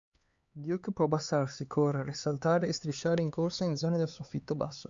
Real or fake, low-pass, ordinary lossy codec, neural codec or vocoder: fake; 7.2 kHz; Opus, 64 kbps; codec, 16 kHz, 4 kbps, X-Codec, HuBERT features, trained on LibriSpeech